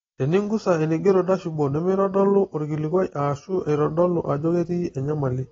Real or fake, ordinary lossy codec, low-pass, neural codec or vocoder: real; AAC, 24 kbps; 7.2 kHz; none